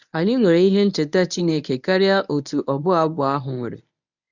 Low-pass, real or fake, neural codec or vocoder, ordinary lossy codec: 7.2 kHz; fake; codec, 24 kHz, 0.9 kbps, WavTokenizer, medium speech release version 1; none